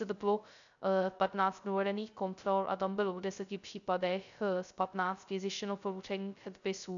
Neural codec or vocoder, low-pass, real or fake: codec, 16 kHz, 0.2 kbps, FocalCodec; 7.2 kHz; fake